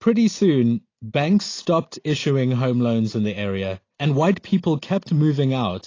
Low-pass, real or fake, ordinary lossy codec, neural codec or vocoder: 7.2 kHz; real; AAC, 32 kbps; none